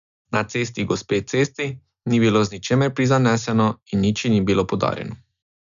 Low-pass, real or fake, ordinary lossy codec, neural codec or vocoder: 7.2 kHz; real; none; none